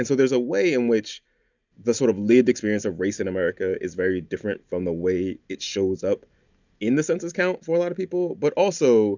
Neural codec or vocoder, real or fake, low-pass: none; real; 7.2 kHz